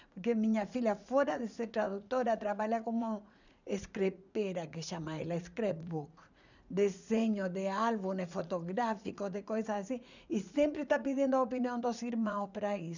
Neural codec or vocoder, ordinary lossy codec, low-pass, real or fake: vocoder, 44.1 kHz, 80 mel bands, Vocos; none; 7.2 kHz; fake